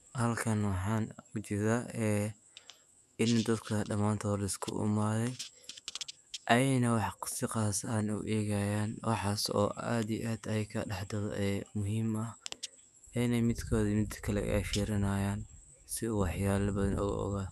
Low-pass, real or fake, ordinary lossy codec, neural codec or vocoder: 14.4 kHz; fake; none; autoencoder, 48 kHz, 128 numbers a frame, DAC-VAE, trained on Japanese speech